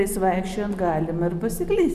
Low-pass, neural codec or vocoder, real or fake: 14.4 kHz; none; real